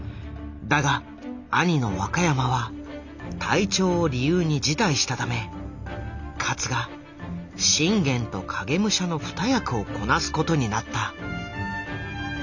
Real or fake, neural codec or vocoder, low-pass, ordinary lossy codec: real; none; 7.2 kHz; none